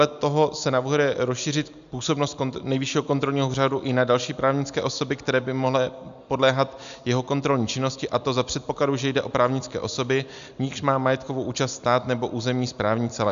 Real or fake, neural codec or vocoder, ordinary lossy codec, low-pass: real; none; AAC, 96 kbps; 7.2 kHz